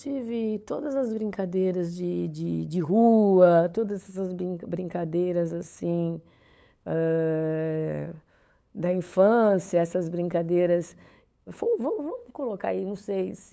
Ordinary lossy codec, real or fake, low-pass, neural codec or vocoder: none; fake; none; codec, 16 kHz, 8 kbps, FunCodec, trained on LibriTTS, 25 frames a second